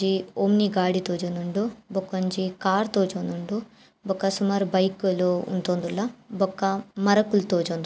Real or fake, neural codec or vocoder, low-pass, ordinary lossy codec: real; none; none; none